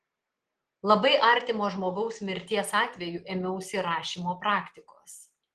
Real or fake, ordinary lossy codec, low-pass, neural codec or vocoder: real; Opus, 16 kbps; 10.8 kHz; none